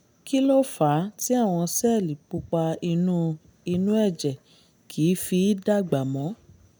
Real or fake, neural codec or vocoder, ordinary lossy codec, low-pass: real; none; none; none